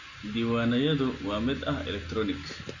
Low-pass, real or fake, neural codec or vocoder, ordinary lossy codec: 7.2 kHz; real; none; none